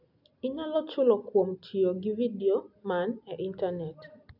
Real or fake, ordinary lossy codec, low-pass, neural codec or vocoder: real; none; 5.4 kHz; none